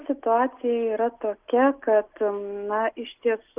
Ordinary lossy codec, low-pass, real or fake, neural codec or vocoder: Opus, 24 kbps; 3.6 kHz; real; none